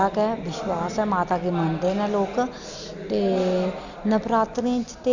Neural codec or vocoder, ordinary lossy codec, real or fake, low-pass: none; none; real; 7.2 kHz